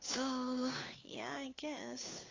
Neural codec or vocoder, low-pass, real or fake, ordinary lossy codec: codec, 16 kHz, 2 kbps, FunCodec, trained on Chinese and English, 25 frames a second; 7.2 kHz; fake; AAC, 32 kbps